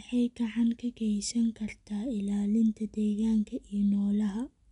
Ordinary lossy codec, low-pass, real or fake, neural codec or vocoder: none; 10.8 kHz; real; none